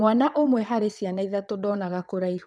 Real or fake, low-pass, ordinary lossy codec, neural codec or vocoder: fake; none; none; vocoder, 22.05 kHz, 80 mel bands, WaveNeXt